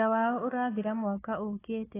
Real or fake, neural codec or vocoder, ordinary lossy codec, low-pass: fake; codec, 16 kHz, 4 kbps, FunCodec, trained on Chinese and English, 50 frames a second; AAC, 16 kbps; 3.6 kHz